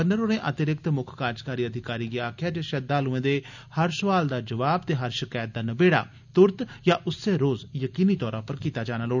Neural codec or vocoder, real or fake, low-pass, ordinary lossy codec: none; real; 7.2 kHz; none